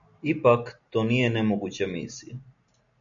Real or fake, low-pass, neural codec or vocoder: real; 7.2 kHz; none